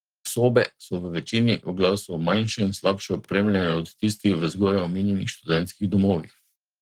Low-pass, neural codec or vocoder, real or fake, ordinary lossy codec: 19.8 kHz; codec, 44.1 kHz, 7.8 kbps, Pupu-Codec; fake; Opus, 24 kbps